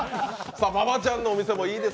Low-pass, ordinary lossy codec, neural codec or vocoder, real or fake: none; none; none; real